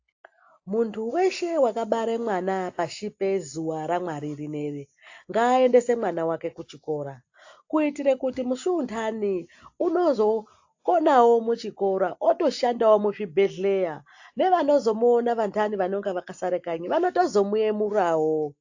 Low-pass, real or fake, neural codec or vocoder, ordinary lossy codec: 7.2 kHz; real; none; AAC, 48 kbps